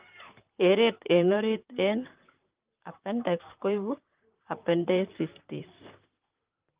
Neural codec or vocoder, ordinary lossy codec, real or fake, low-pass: vocoder, 44.1 kHz, 128 mel bands, Pupu-Vocoder; Opus, 32 kbps; fake; 3.6 kHz